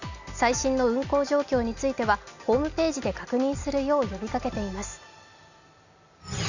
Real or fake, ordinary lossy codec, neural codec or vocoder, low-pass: real; none; none; 7.2 kHz